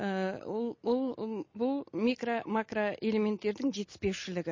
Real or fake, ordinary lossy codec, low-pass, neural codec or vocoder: real; MP3, 32 kbps; 7.2 kHz; none